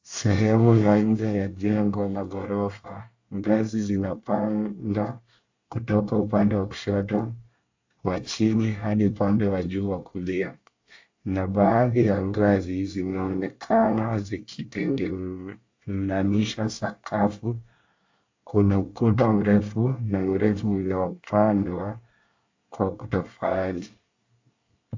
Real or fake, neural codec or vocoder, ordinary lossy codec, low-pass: fake; codec, 24 kHz, 1 kbps, SNAC; AAC, 48 kbps; 7.2 kHz